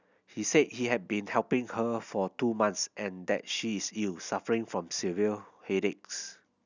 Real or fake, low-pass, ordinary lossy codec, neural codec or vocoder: real; 7.2 kHz; none; none